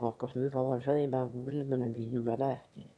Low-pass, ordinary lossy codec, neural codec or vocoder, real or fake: none; none; autoencoder, 22.05 kHz, a latent of 192 numbers a frame, VITS, trained on one speaker; fake